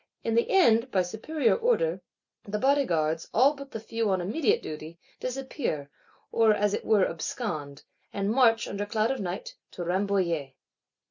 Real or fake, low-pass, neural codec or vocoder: real; 7.2 kHz; none